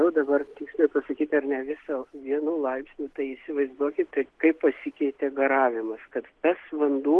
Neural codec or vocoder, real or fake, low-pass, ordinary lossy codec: none; real; 10.8 kHz; Opus, 16 kbps